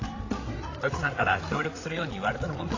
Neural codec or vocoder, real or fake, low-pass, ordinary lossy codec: codec, 16 kHz, 8 kbps, FreqCodec, larger model; fake; 7.2 kHz; AAC, 32 kbps